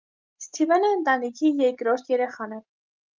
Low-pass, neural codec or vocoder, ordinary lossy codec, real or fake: 7.2 kHz; none; Opus, 24 kbps; real